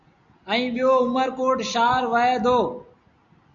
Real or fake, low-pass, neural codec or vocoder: real; 7.2 kHz; none